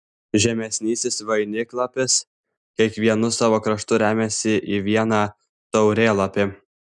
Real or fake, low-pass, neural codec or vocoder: real; 10.8 kHz; none